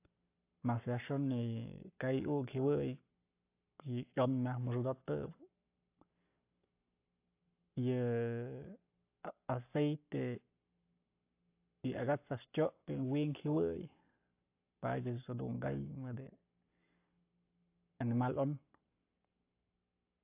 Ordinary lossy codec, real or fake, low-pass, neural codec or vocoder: AAC, 24 kbps; fake; 3.6 kHz; codec, 44.1 kHz, 7.8 kbps, Pupu-Codec